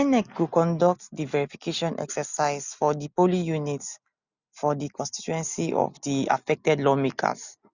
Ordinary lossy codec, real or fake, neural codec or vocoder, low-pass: none; real; none; 7.2 kHz